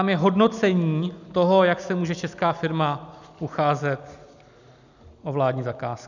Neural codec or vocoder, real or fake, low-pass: none; real; 7.2 kHz